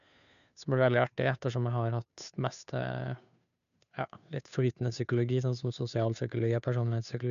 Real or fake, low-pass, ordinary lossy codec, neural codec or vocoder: fake; 7.2 kHz; none; codec, 16 kHz, 2 kbps, FunCodec, trained on Chinese and English, 25 frames a second